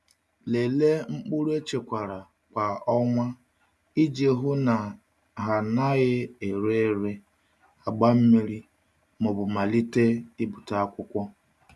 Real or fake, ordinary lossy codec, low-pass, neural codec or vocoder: real; none; none; none